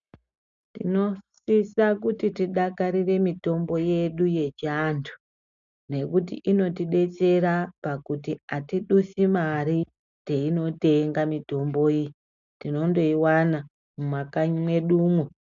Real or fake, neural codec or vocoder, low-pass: real; none; 7.2 kHz